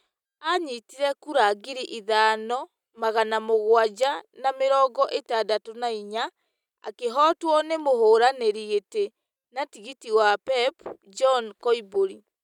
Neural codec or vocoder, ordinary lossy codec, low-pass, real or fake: none; none; 19.8 kHz; real